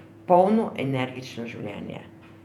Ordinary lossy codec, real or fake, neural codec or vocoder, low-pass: none; fake; vocoder, 48 kHz, 128 mel bands, Vocos; 19.8 kHz